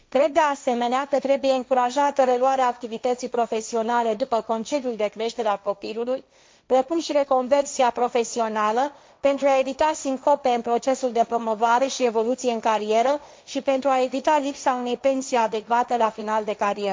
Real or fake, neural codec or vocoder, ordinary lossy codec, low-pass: fake; codec, 16 kHz, 1.1 kbps, Voila-Tokenizer; none; none